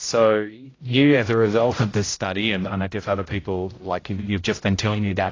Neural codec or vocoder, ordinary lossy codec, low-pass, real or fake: codec, 16 kHz, 0.5 kbps, X-Codec, HuBERT features, trained on general audio; AAC, 32 kbps; 7.2 kHz; fake